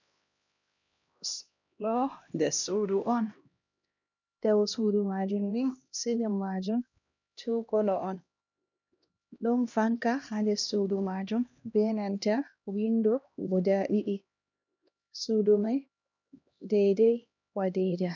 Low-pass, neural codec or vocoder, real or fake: 7.2 kHz; codec, 16 kHz, 1 kbps, X-Codec, HuBERT features, trained on LibriSpeech; fake